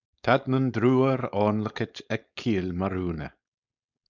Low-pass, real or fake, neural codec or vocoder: 7.2 kHz; fake; codec, 16 kHz, 4.8 kbps, FACodec